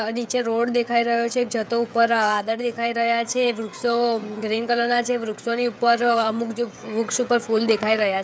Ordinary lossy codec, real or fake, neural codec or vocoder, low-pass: none; fake; codec, 16 kHz, 16 kbps, FreqCodec, smaller model; none